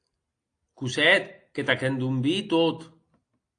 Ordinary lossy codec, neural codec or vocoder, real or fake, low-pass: AAC, 48 kbps; none; real; 9.9 kHz